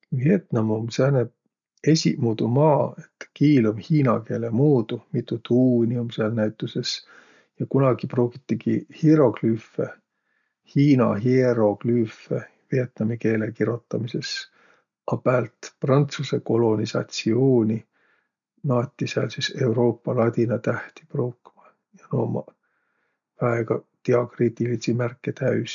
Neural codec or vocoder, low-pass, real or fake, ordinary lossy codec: none; 7.2 kHz; real; none